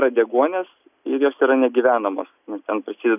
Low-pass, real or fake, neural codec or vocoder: 3.6 kHz; real; none